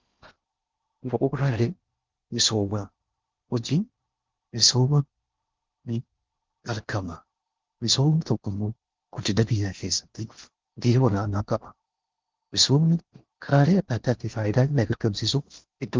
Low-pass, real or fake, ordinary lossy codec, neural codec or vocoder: 7.2 kHz; fake; Opus, 32 kbps; codec, 16 kHz in and 24 kHz out, 0.6 kbps, FocalCodec, streaming, 4096 codes